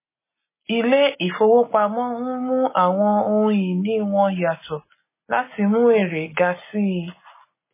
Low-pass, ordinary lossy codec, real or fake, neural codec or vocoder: 3.6 kHz; MP3, 16 kbps; real; none